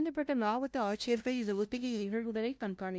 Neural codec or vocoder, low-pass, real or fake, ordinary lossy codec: codec, 16 kHz, 0.5 kbps, FunCodec, trained on LibriTTS, 25 frames a second; none; fake; none